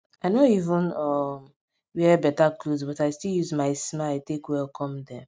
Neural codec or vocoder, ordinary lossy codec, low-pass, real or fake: none; none; none; real